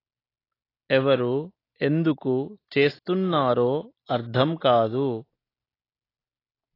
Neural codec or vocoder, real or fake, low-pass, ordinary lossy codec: none; real; 5.4 kHz; AAC, 24 kbps